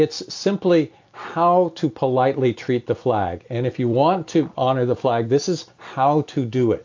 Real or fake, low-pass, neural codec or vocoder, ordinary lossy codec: real; 7.2 kHz; none; AAC, 48 kbps